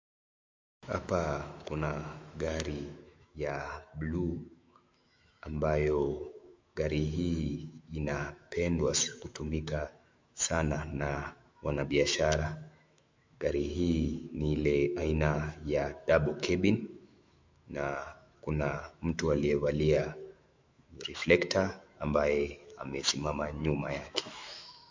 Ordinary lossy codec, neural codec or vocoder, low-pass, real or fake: MP3, 64 kbps; autoencoder, 48 kHz, 128 numbers a frame, DAC-VAE, trained on Japanese speech; 7.2 kHz; fake